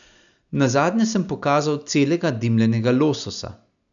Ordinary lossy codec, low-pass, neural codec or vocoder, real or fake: none; 7.2 kHz; none; real